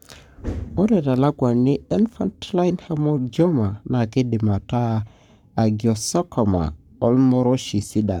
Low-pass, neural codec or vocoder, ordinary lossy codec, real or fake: 19.8 kHz; codec, 44.1 kHz, 7.8 kbps, Pupu-Codec; none; fake